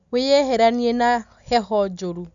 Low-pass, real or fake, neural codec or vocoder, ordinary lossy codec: 7.2 kHz; real; none; none